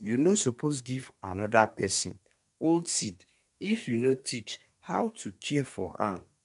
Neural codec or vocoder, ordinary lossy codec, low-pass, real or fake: codec, 24 kHz, 1 kbps, SNAC; none; 10.8 kHz; fake